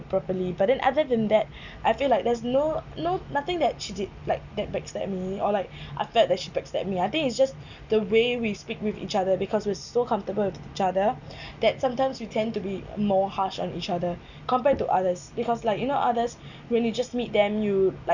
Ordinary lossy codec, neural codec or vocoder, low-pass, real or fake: none; none; 7.2 kHz; real